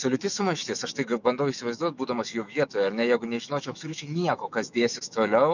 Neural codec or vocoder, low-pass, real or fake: autoencoder, 48 kHz, 128 numbers a frame, DAC-VAE, trained on Japanese speech; 7.2 kHz; fake